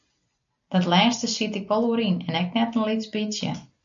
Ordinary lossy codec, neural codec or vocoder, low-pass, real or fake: MP3, 64 kbps; none; 7.2 kHz; real